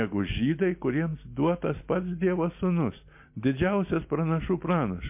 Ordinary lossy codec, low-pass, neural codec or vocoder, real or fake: MP3, 32 kbps; 3.6 kHz; none; real